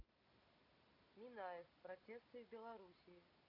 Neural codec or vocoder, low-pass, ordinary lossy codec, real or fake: none; 5.4 kHz; none; real